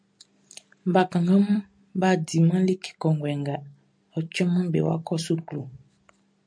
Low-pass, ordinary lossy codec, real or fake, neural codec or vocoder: 9.9 kHz; MP3, 64 kbps; real; none